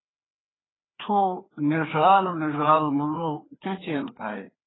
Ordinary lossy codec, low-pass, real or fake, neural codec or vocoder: AAC, 16 kbps; 7.2 kHz; fake; codec, 16 kHz, 2 kbps, FreqCodec, larger model